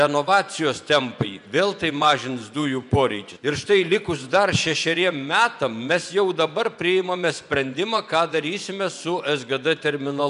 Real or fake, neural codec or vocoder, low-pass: fake; vocoder, 24 kHz, 100 mel bands, Vocos; 10.8 kHz